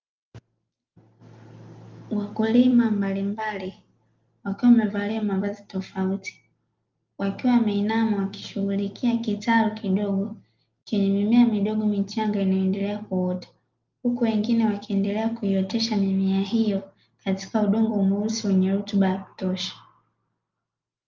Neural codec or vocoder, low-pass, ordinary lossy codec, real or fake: none; 7.2 kHz; Opus, 32 kbps; real